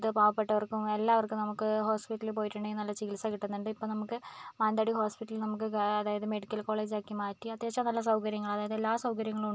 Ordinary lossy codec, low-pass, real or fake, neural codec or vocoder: none; none; real; none